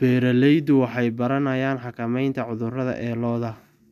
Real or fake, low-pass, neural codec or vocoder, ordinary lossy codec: real; 14.4 kHz; none; none